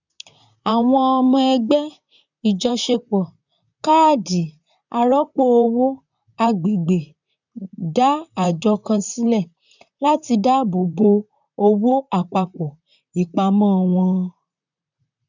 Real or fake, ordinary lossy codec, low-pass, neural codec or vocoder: fake; none; 7.2 kHz; vocoder, 44.1 kHz, 128 mel bands, Pupu-Vocoder